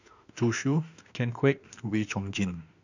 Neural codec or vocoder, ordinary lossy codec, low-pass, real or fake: autoencoder, 48 kHz, 32 numbers a frame, DAC-VAE, trained on Japanese speech; none; 7.2 kHz; fake